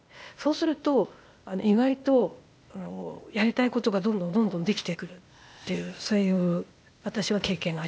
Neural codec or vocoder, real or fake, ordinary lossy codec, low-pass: codec, 16 kHz, 0.8 kbps, ZipCodec; fake; none; none